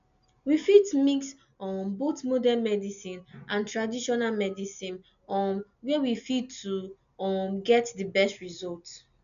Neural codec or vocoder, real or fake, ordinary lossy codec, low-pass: none; real; none; 7.2 kHz